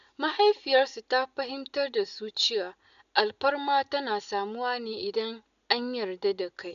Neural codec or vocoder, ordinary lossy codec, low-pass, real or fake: none; none; 7.2 kHz; real